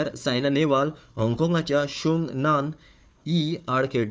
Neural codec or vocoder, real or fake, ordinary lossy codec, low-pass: codec, 16 kHz, 4 kbps, FunCodec, trained on Chinese and English, 50 frames a second; fake; none; none